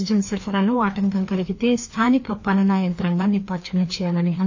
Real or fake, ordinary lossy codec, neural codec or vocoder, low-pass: fake; AAC, 48 kbps; codec, 16 kHz, 2 kbps, FreqCodec, larger model; 7.2 kHz